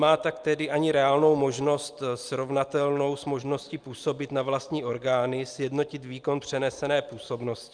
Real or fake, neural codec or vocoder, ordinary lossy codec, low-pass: real; none; Opus, 32 kbps; 9.9 kHz